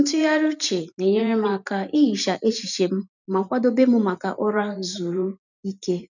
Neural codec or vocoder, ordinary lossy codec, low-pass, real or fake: vocoder, 44.1 kHz, 128 mel bands every 512 samples, BigVGAN v2; none; 7.2 kHz; fake